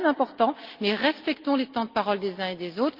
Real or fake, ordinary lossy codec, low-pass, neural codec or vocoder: real; Opus, 32 kbps; 5.4 kHz; none